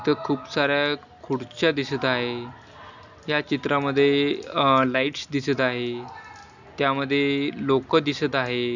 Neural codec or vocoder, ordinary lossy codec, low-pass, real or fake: none; none; 7.2 kHz; real